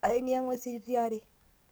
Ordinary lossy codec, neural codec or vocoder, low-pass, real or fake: none; codec, 44.1 kHz, 7.8 kbps, DAC; none; fake